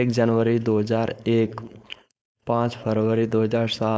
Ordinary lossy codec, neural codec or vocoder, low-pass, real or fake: none; codec, 16 kHz, 4.8 kbps, FACodec; none; fake